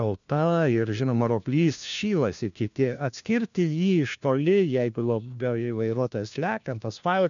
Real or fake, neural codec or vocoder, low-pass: fake; codec, 16 kHz, 1 kbps, FunCodec, trained on LibriTTS, 50 frames a second; 7.2 kHz